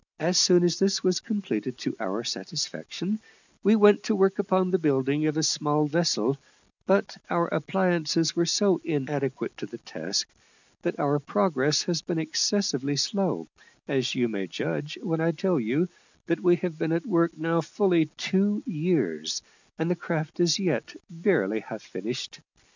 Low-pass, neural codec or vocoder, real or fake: 7.2 kHz; none; real